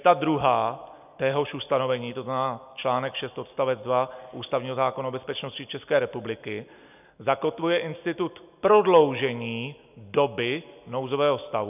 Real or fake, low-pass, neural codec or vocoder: real; 3.6 kHz; none